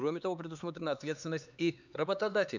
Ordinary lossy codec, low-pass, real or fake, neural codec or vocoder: none; 7.2 kHz; fake; codec, 16 kHz, 4 kbps, X-Codec, HuBERT features, trained on LibriSpeech